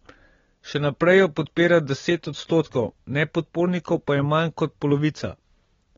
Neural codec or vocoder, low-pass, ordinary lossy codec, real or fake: none; 7.2 kHz; AAC, 24 kbps; real